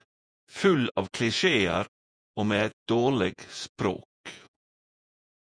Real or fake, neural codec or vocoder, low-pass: fake; vocoder, 48 kHz, 128 mel bands, Vocos; 9.9 kHz